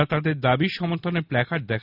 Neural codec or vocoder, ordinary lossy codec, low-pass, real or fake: none; none; 5.4 kHz; real